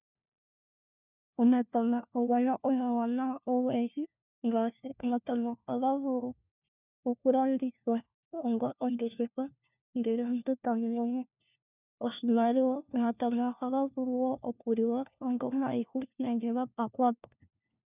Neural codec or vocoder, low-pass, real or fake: codec, 16 kHz, 1 kbps, FunCodec, trained on LibriTTS, 50 frames a second; 3.6 kHz; fake